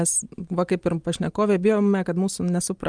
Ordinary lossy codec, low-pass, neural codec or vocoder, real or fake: Opus, 32 kbps; 9.9 kHz; none; real